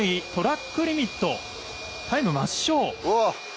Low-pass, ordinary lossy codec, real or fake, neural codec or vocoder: none; none; real; none